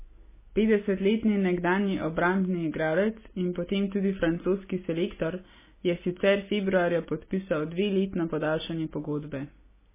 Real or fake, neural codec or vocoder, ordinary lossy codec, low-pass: real; none; MP3, 16 kbps; 3.6 kHz